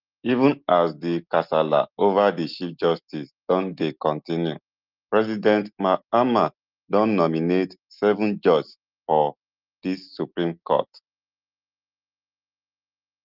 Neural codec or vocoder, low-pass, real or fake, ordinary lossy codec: none; 5.4 kHz; real; Opus, 24 kbps